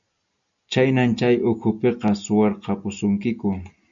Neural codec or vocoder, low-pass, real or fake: none; 7.2 kHz; real